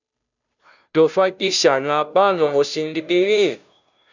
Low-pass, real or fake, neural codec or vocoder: 7.2 kHz; fake; codec, 16 kHz, 0.5 kbps, FunCodec, trained on Chinese and English, 25 frames a second